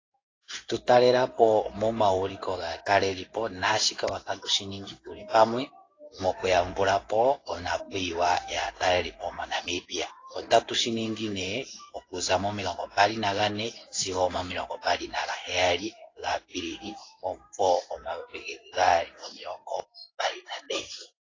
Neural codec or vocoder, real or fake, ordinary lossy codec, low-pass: codec, 16 kHz in and 24 kHz out, 1 kbps, XY-Tokenizer; fake; AAC, 32 kbps; 7.2 kHz